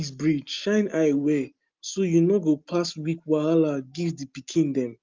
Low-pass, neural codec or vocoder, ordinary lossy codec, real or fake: 7.2 kHz; none; Opus, 32 kbps; real